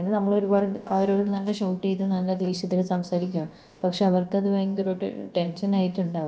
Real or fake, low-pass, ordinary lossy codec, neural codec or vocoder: fake; none; none; codec, 16 kHz, about 1 kbps, DyCAST, with the encoder's durations